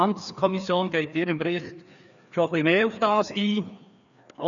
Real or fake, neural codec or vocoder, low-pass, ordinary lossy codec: fake; codec, 16 kHz, 2 kbps, FreqCodec, larger model; 7.2 kHz; none